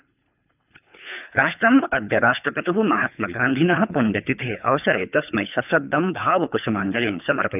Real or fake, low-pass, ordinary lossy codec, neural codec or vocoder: fake; 3.6 kHz; none; codec, 24 kHz, 3 kbps, HILCodec